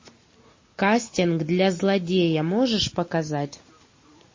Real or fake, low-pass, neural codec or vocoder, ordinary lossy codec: real; 7.2 kHz; none; MP3, 32 kbps